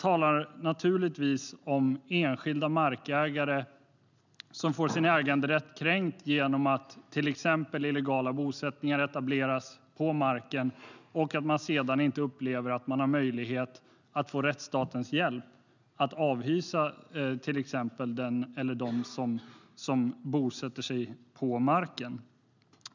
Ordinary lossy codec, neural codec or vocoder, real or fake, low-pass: none; none; real; 7.2 kHz